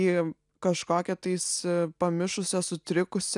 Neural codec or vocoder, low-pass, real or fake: none; 10.8 kHz; real